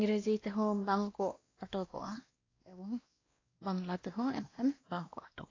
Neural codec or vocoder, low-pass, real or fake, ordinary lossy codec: codec, 16 kHz, 1 kbps, X-Codec, WavLM features, trained on Multilingual LibriSpeech; 7.2 kHz; fake; AAC, 32 kbps